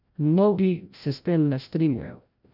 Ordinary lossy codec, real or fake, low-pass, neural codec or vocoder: none; fake; 5.4 kHz; codec, 16 kHz, 0.5 kbps, FreqCodec, larger model